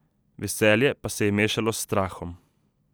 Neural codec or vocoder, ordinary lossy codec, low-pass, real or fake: none; none; none; real